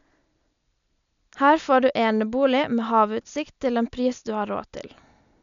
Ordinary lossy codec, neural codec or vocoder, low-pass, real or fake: none; none; 7.2 kHz; real